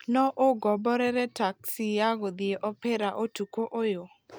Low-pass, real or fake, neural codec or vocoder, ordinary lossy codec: none; real; none; none